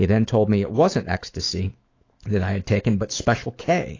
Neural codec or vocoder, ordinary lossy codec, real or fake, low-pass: autoencoder, 48 kHz, 128 numbers a frame, DAC-VAE, trained on Japanese speech; AAC, 32 kbps; fake; 7.2 kHz